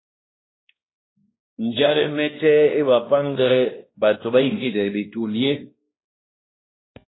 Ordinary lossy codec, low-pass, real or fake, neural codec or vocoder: AAC, 16 kbps; 7.2 kHz; fake; codec, 16 kHz, 1 kbps, X-Codec, WavLM features, trained on Multilingual LibriSpeech